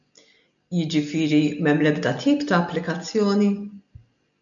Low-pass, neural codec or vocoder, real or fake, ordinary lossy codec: 7.2 kHz; none; real; MP3, 96 kbps